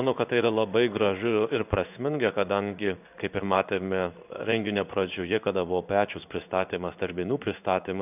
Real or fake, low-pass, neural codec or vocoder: fake; 3.6 kHz; codec, 16 kHz in and 24 kHz out, 1 kbps, XY-Tokenizer